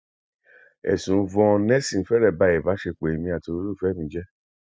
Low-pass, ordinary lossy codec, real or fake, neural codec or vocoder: none; none; real; none